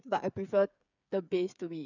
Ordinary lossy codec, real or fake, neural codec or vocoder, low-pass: none; fake; codec, 16 kHz, 8 kbps, FreqCodec, smaller model; 7.2 kHz